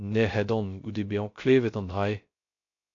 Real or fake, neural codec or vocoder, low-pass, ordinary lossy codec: fake; codec, 16 kHz, 0.3 kbps, FocalCodec; 7.2 kHz; AAC, 48 kbps